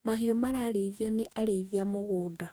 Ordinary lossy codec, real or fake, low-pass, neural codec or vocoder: none; fake; none; codec, 44.1 kHz, 2.6 kbps, DAC